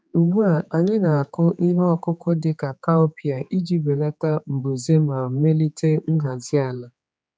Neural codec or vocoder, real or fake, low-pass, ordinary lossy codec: codec, 16 kHz, 4 kbps, X-Codec, HuBERT features, trained on general audio; fake; none; none